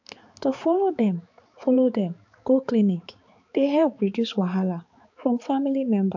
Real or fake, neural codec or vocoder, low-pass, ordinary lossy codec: fake; codec, 16 kHz, 4 kbps, X-Codec, HuBERT features, trained on balanced general audio; 7.2 kHz; AAC, 48 kbps